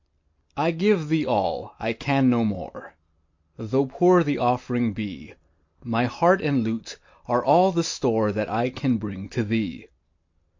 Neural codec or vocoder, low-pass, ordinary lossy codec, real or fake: none; 7.2 kHz; MP3, 48 kbps; real